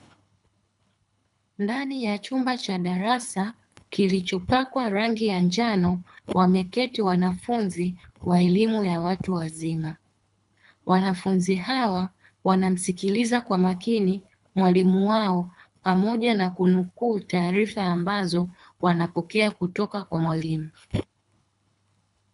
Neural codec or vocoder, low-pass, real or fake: codec, 24 kHz, 3 kbps, HILCodec; 10.8 kHz; fake